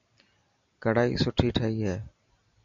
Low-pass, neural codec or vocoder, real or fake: 7.2 kHz; none; real